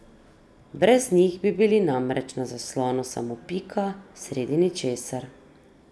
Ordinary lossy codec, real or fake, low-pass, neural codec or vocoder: none; real; none; none